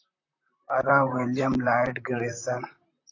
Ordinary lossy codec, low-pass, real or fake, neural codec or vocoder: AAC, 48 kbps; 7.2 kHz; fake; vocoder, 44.1 kHz, 128 mel bands, Pupu-Vocoder